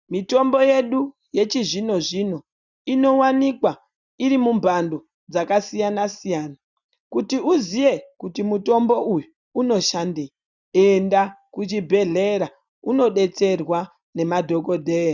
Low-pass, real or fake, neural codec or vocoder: 7.2 kHz; real; none